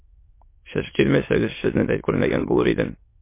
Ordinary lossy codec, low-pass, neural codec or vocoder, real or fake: MP3, 24 kbps; 3.6 kHz; autoencoder, 22.05 kHz, a latent of 192 numbers a frame, VITS, trained on many speakers; fake